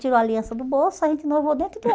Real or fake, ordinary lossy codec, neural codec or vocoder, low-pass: real; none; none; none